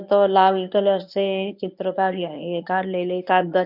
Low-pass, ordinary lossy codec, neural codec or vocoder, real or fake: 5.4 kHz; none; codec, 24 kHz, 0.9 kbps, WavTokenizer, medium speech release version 1; fake